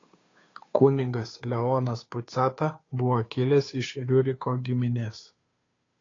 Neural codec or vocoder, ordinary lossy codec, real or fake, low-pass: codec, 16 kHz, 2 kbps, FunCodec, trained on Chinese and English, 25 frames a second; AAC, 32 kbps; fake; 7.2 kHz